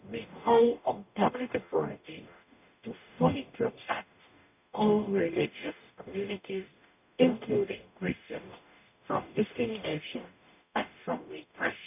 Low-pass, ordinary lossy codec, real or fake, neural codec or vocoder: 3.6 kHz; none; fake; codec, 44.1 kHz, 0.9 kbps, DAC